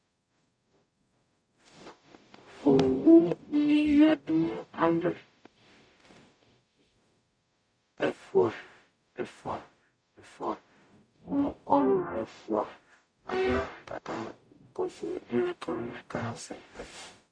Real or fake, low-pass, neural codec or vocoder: fake; 9.9 kHz; codec, 44.1 kHz, 0.9 kbps, DAC